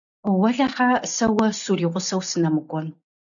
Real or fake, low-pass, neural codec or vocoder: real; 7.2 kHz; none